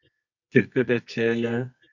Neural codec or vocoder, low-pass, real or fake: codec, 24 kHz, 0.9 kbps, WavTokenizer, medium music audio release; 7.2 kHz; fake